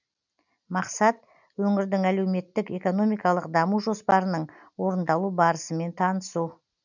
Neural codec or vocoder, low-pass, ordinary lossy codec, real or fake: none; 7.2 kHz; none; real